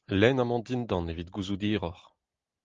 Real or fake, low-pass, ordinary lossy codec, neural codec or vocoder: real; 7.2 kHz; Opus, 32 kbps; none